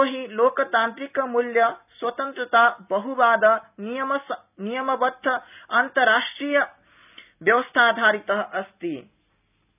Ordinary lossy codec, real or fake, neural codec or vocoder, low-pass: none; real; none; 3.6 kHz